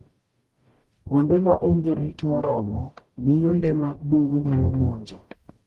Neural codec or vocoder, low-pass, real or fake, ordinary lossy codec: codec, 44.1 kHz, 0.9 kbps, DAC; 14.4 kHz; fake; Opus, 24 kbps